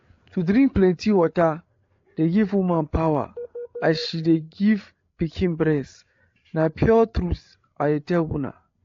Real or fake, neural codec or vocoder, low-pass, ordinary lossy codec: fake; codec, 16 kHz, 8 kbps, FreqCodec, larger model; 7.2 kHz; AAC, 48 kbps